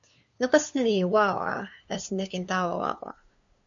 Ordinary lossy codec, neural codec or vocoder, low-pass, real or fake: MP3, 96 kbps; codec, 16 kHz, 2 kbps, FunCodec, trained on LibriTTS, 25 frames a second; 7.2 kHz; fake